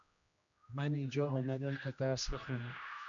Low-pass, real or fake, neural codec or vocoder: 7.2 kHz; fake; codec, 16 kHz, 1 kbps, X-Codec, HuBERT features, trained on general audio